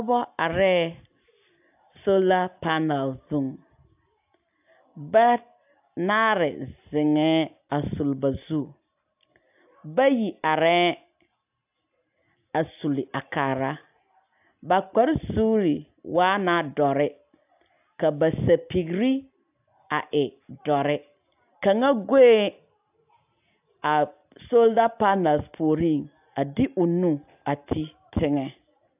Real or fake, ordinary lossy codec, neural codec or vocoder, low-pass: real; AAC, 32 kbps; none; 3.6 kHz